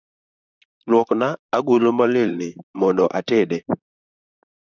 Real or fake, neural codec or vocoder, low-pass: fake; vocoder, 44.1 kHz, 128 mel bands, Pupu-Vocoder; 7.2 kHz